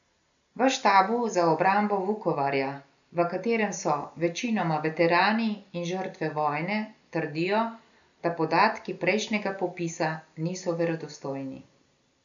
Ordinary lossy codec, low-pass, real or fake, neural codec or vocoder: none; 7.2 kHz; real; none